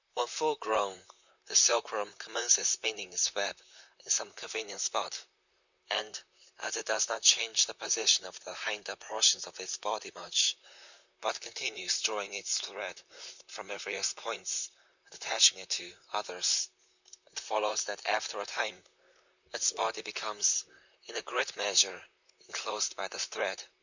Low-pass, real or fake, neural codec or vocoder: 7.2 kHz; fake; vocoder, 44.1 kHz, 128 mel bands, Pupu-Vocoder